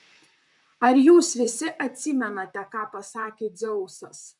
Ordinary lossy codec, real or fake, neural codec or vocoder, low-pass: MP3, 96 kbps; fake; vocoder, 44.1 kHz, 128 mel bands, Pupu-Vocoder; 10.8 kHz